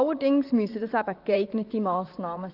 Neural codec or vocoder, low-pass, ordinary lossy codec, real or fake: vocoder, 44.1 kHz, 128 mel bands, Pupu-Vocoder; 5.4 kHz; Opus, 32 kbps; fake